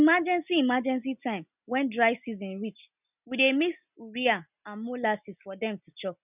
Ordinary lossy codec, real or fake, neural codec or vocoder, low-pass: none; real; none; 3.6 kHz